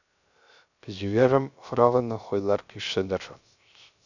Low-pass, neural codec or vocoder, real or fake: 7.2 kHz; codec, 16 kHz, 0.3 kbps, FocalCodec; fake